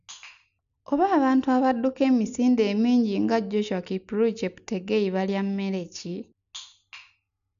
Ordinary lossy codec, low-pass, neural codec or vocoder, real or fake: none; 7.2 kHz; none; real